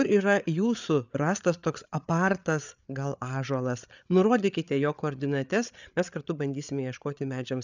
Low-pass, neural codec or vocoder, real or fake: 7.2 kHz; codec, 16 kHz, 8 kbps, FreqCodec, larger model; fake